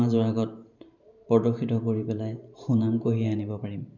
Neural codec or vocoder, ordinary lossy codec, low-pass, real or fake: vocoder, 44.1 kHz, 128 mel bands every 512 samples, BigVGAN v2; none; 7.2 kHz; fake